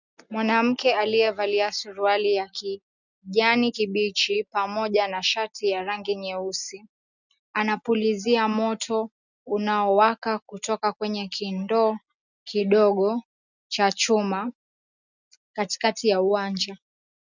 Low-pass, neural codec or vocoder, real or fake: 7.2 kHz; none; real